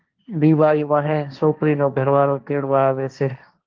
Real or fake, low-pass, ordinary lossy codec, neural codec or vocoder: fake; 7.2 kHz; Opus, 32 kbps; codec, 16 kHz, 1.1 kbps, Voila-Tokenizer